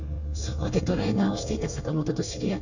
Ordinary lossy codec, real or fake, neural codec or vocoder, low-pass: none; fake; codec, 24 kHz, 1 kbps, SNAC; 7.2 kHz